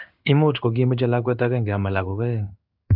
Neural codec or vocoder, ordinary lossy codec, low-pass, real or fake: codec, 16 kHz in and 24 kHz out, 1 kbps, XY-Tokenizer; AAC, 48 kbps; 5.4 kHz; fake